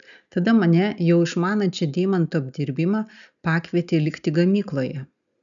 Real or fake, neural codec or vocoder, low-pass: real; none; 7.2 kHz